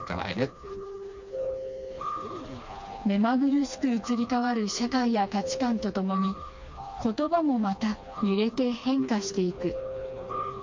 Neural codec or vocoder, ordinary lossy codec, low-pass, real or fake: codec, 16 kHz, 2 kbps, FreqCodec, smaller model; MP3, 48 kbps; 7.2 kHz; fake